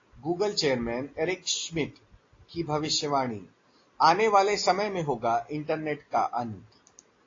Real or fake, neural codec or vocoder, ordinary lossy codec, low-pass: real; none; AAC, 32 kbps; 7.2 kHz